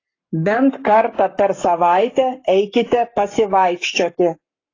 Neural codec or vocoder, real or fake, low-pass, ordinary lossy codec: codec, 44.1 kHz, 7.8 kbps, Pupu-Codec; fake; 7.2 kHz; AAC, 32 kbps